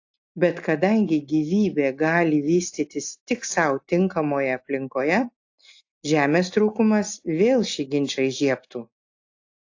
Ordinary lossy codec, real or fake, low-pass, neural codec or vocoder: AAC, 48 kbps; real; 7.2 kHz; none